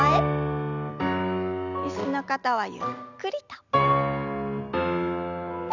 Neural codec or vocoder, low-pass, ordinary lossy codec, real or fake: none; 7.2 kHz; none; real